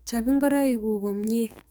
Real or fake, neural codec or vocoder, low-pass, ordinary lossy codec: fake; codec, 44.1 kHz, 2.6 kbps, SNAC; none; none